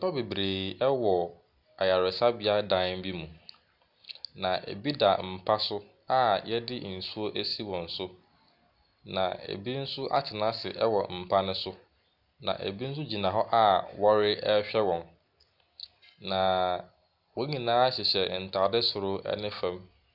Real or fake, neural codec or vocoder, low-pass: real; none; 5.4 kHz